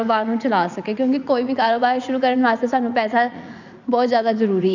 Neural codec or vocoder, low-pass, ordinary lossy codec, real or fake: vocoder, 44.1 kHz, 80 mel bands, Vocos; 7.2 kHz; none; fake